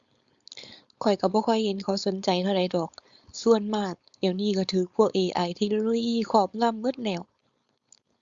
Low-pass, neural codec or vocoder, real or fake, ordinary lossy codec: 7.2 kHz; codec, 16 kHz, 4.8 kbps, FACodec; fake; Opus, 64 kbps